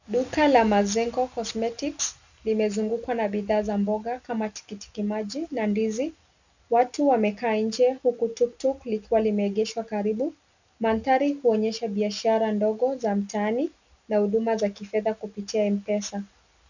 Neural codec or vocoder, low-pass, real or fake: none; 7.2 kHz; real